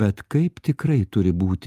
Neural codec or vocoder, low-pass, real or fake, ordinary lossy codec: none; 14.4 kHz; real; Opus, 32 kbps